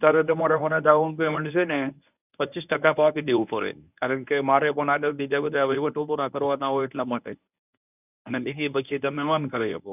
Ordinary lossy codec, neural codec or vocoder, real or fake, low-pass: none; codec, 24 kHz, 0.9 kbps, WavTokenizer, medium speech release version 1; fake; 3.6 kHz